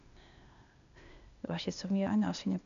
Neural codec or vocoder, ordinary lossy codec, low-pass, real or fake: codec, 16 kHz, 0.8 kbps, ZipCodec; none; 7.2 kHz; fake